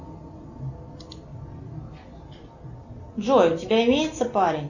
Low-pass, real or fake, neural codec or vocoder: 7.2 kHz; real; none